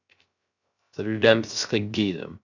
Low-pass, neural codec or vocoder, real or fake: 7.2 kHz; codec, 16 kHz, 0.3 kbps, FocalCodec; fake